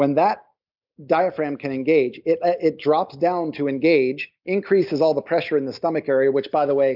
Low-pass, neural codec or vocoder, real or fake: 5.4 kHz; none; real